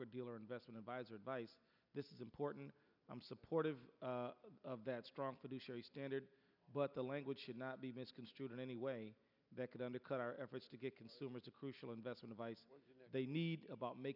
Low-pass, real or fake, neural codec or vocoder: 5.4 kHz; real; none